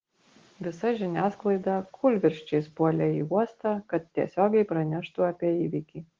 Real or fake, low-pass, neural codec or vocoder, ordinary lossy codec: real; 7.2 kHz; none; Opus, 16 kbps